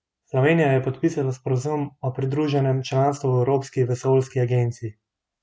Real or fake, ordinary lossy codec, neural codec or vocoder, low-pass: real; none; none; none